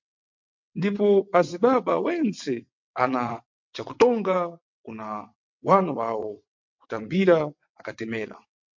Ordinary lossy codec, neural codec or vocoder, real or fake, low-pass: MP3, 48 kbps; vocoder, 22.05 kHz, 80 mel bands, WaveNeXt; fake; 7.2 kHz